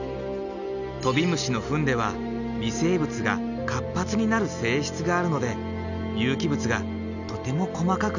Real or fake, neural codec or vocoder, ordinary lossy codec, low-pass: real; none; none; 7.2 kHz